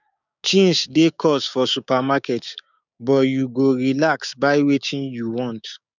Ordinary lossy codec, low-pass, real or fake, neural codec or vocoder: none; 7.2 kHz; fake; codec, 16 kHz, 6 kbps, DAC